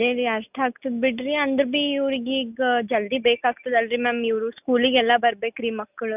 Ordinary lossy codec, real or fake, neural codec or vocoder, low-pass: none; real; none; 3.6 kHz